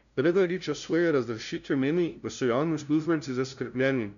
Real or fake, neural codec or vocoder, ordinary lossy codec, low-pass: fake; codec, 16 kHz, 0.5 kbps, FunCodec, trained on LibriTTS, 25 frames a second; none; 7.2 kHz